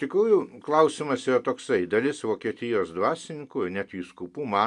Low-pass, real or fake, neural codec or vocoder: 10.8 kHz; real; none